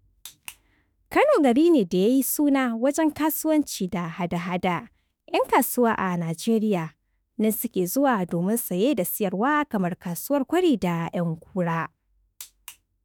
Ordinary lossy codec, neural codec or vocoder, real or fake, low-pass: none; autoencoder, 48 kHz, 32 numbers a frame, DAC-VAE, trained on Japanese speech; fake; none